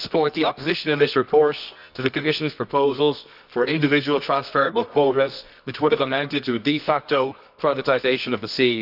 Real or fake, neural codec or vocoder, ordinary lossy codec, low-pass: fake; codec, 24 kHz, 0.9 kbps, WavTokenizer, medium music audio release; none; 5.4 kHz